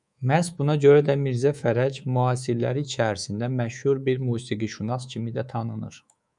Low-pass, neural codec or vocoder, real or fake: 10.8 kHz; codec, 24 kHz, 3.1 kbps, DualCodec; fake